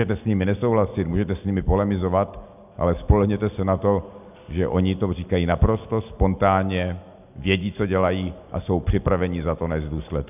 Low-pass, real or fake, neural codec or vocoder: 3.6 kHz; real; none